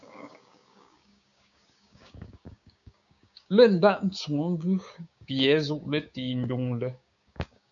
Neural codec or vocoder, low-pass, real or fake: codec, 16 kHz, 6 kbps, DAC; 7.2 kHz; fake